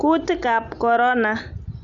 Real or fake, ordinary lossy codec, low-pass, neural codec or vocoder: real; none; 7.2 kHz; none